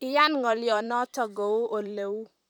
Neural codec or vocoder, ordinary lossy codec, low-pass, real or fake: vocoder, 44.1 kHz, 128 mel bands, Pupu-Vocoder; none; none; fake